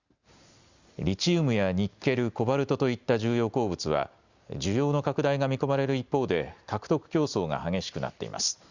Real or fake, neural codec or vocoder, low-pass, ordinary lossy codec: real; none; 7.2 kHz; Opus, 64 kbps